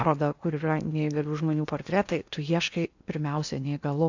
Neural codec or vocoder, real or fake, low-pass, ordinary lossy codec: codec, 16 kHz in and 24 kHz out, 0.8 kbps, FocalCodec, streaming, 65536 codes; fake; 7.2 kHz; AAC, 48 kbps